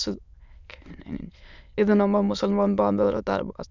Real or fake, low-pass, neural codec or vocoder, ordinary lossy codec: fake; 7.2 kHz; autoencoder, 22.05 kHz, a latent of 192 numbers a frame, VITS, trained on many speakers; none